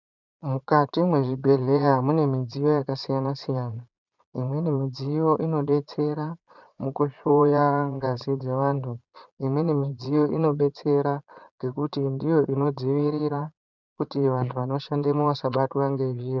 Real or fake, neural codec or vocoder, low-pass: fake; vocoder, 22.05 kHz, 80 mel bands, Vocos; 7.2 kHz